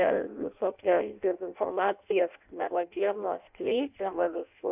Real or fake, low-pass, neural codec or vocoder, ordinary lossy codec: fake; 3.6 kHz; codec, 16 kHz in and 24 kHz out, 0.6 kbps, FireRedTTS-2 codec; AAC, 32 kbps